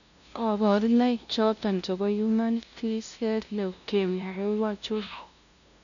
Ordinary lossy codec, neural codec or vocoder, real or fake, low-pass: none; codec, 16 kHz, 0.5 kbps, FunCodec, trained on LibriTTS, 25 frames a second; fake; 7.2 kHz